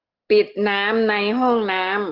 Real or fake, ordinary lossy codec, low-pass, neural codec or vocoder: real; Opus, 16 kbps; 5.4 kHz; none